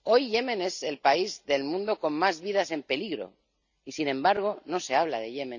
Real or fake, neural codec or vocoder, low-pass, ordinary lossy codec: real; none; 7.2 kHz; none